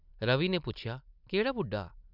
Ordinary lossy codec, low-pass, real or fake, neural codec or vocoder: none; 5.4 kHz; real; none